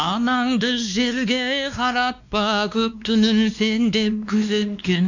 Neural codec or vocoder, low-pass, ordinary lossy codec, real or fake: codec, 16 kHz, 2 kbps, X-Codec, WavLM features, trained on Multilingual LibriSpeech; 7.2 kHz; none; fake